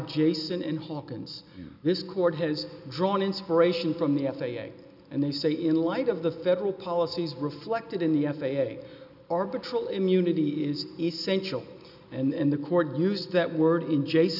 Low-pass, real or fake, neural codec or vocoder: 5.4 kHz; real; none